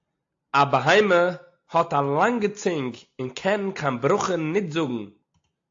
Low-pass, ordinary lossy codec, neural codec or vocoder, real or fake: 7.2 kHz; AAC, 48 kbps; none; real